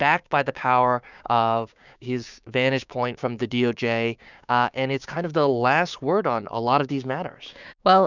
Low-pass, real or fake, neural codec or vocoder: 7.2 kHz; fake; codec, 16 kHz, 6 kbps, DAC